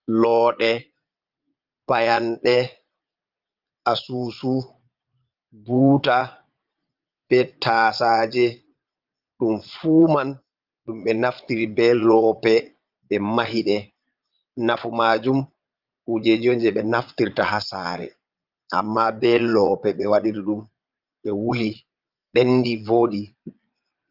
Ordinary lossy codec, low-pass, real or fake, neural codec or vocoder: Opus, 24 kbps; 5.4 kHz; fake; vocoder, 22.05 kHz, 80 mel bands, Vocos